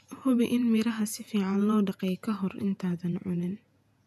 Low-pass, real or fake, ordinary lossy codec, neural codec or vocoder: 14.4 kHz; fake; none; vocoder, 48 kHz, 128 mel bands, Vocos